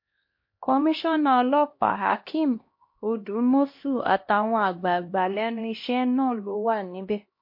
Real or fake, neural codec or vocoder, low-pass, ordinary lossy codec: fake; codec, 16 kHz, 1 kbps, X-Codec, HuBERT features, trained on LibriSpeech; 5.4 kHz; MP3, 32 kbps